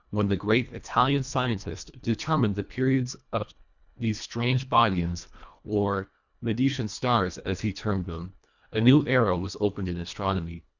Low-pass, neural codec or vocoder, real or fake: 7.2 kHz; codec, 24 kHz, 1.5 kbps, HILCodec; fake